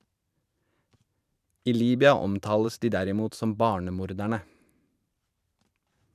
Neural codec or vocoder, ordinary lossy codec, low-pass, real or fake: vocoder, 44.1 kHz, 128 mel bands every 512 samples, BigVGAN v2; none; 14.4 kHz; fake